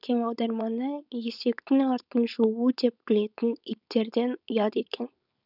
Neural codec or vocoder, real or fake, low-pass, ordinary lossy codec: codec, 16 kHz, 4.8 kbps, FACodec; fake; 5.4 kHz; none